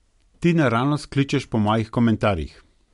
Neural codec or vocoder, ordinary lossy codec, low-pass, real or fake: none; MP3, 64 kbps; 10.8 kHz; real